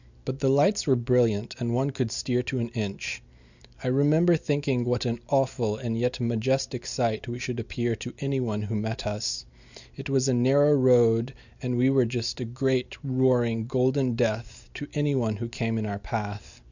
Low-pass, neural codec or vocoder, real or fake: 7.2 kHz; none; real